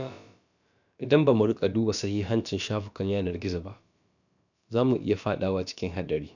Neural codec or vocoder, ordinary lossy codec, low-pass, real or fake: codec, 16 kHz, about 1 kbps, DyCAST, with the encoder's durations; none; 7.2 kHz; fake